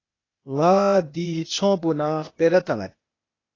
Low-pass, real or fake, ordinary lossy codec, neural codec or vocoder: 7.2 kHz; fake; AAC, 32 kbps; codec, 16 kHz, 0.8 kbps, ZipCodec